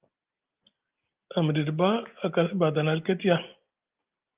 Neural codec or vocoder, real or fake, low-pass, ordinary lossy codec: none; real; 3.6 kHz; Opus, 24 kbps